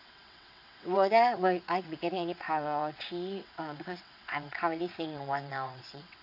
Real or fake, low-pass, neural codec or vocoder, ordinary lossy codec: fake; 5.4 kHz; vocoder, 22.05 kHz, 80 mel bands, WaveNeXt; AAC, 48 kbps